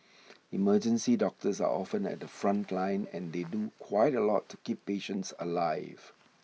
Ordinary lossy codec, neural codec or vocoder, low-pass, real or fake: none; none; none; real